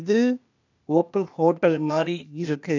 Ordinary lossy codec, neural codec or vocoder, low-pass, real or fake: none; codec, 16 kHz, 0.8 kbps, ZipCodec; 7.2 kHz; fake